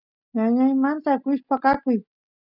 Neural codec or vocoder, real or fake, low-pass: none; real; 5.4 kHz